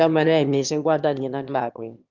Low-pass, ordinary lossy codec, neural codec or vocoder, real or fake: 7.2 kHz; Opus, 32 kbps; autoencoder, 22.05 kHz, a latent of 192 numbers a frame, VITS, trained on one speaker; fake